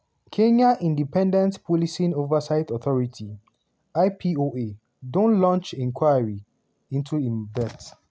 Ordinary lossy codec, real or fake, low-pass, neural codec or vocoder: none; real; none; none